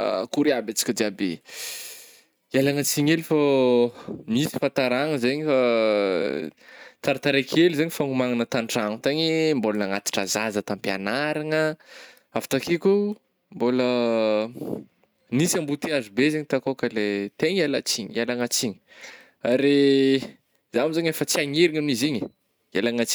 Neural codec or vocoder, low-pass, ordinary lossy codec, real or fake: none; none; none; real